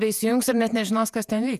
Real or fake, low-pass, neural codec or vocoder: fake; 14.4 kHz; vocoder, 48 kHz, 128 mel bands, Vocos